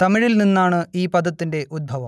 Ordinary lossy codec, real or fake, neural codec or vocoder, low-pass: none; real; none; none